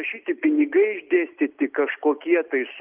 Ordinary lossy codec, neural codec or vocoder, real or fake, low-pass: Opus, 32 kbps; none; real; 3.6 kHz